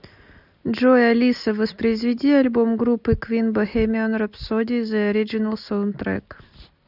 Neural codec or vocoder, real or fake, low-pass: none; real; 5.4 kHz